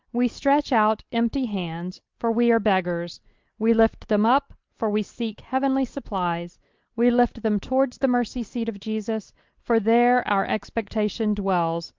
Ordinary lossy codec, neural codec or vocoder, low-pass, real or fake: Opus, 24 kbps; none; 7.2 kHz; real